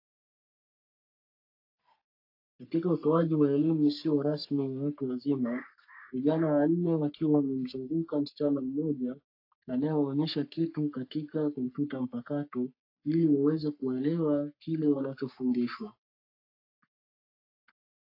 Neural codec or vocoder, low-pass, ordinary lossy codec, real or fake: codec, 44.1 kHz, 3.4 kbps, Pupu-Codec; 5.4 kHz; AAC, 32 kbps; fake